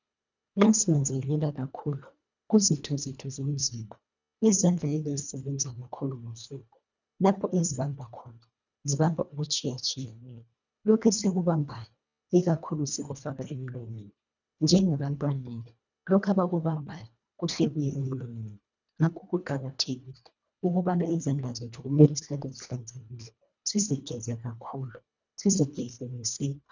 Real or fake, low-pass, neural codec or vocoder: fake; 7.2 kHz; codec, 24 kHz, 1.5 kbps, HILCodec